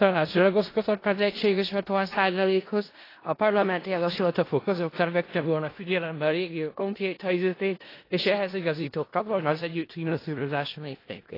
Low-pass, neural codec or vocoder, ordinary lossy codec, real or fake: 5.4 kHz; codec, 16 kHz in and 24 kHz out, 0.4 kbps, LongCat-Audio-Codec, four codebook decoder; AAC, 24 kbps; fake